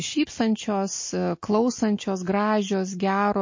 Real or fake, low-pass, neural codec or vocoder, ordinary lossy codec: real; 7.2 kHz; none; MP3, 32 kbps